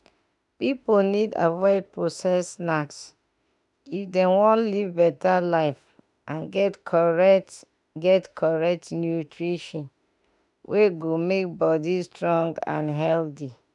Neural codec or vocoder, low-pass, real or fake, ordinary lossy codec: autoencoder, 48 kHz, 32 numbers a frame, DAC-VAE, trained on Japanese speech; 10.8 kHz; fake; none